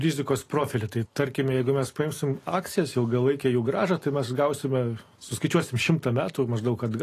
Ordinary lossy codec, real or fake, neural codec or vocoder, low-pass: AAC, 48 kbps; real; none; 14.4 kHz